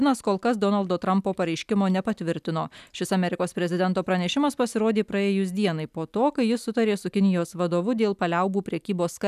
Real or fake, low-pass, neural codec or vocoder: real; 14.4 kHz; none